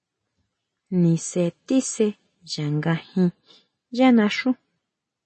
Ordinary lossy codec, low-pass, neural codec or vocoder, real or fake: MP3, 32 kbps; 10.8 kHz; none; real